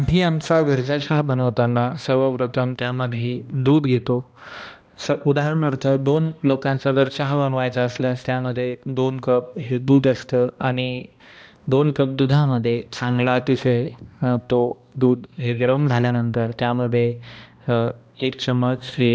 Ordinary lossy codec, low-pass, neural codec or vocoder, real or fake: none; none; codec, 16 kHz, 1 kbps, X-Codec, HuBERT features, trained on balanced general audio; fake